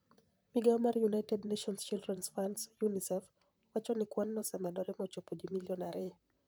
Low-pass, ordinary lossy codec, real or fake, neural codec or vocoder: none; none; fake; vocoder, 44.1 kHz, 128 mel bands, Pupu-Vocoder